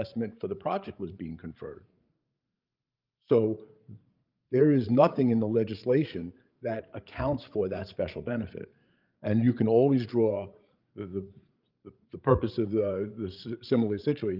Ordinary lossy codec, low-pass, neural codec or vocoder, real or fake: Opus, 24 kbps; 5.4 kHz; codec, 16 kHz, 16 kbps, FreqCodec, larger model; fake